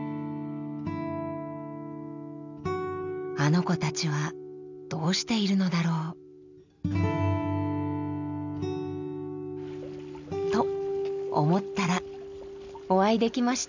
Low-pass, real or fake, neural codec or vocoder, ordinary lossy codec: 7.2 kHz; real; none; none